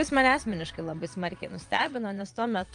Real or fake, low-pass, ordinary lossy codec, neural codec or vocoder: fake; 9.9 kHz; Opus, 32 kbps; vocoder, 22.05 kHz, 80 mel bands, Vocos